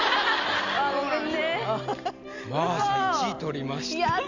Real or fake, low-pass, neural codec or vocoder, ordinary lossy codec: real; 7.2 kHz; none; none